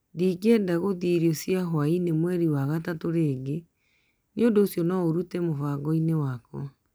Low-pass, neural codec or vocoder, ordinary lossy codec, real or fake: none; none; none; real